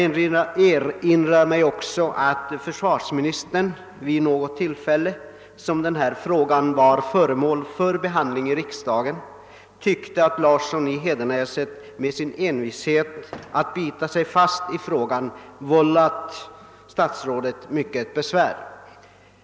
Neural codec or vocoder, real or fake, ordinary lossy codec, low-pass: none; real; none; none